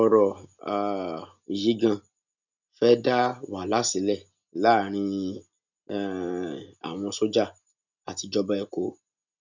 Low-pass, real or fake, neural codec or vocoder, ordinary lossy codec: 7.2 kHz; real; none; none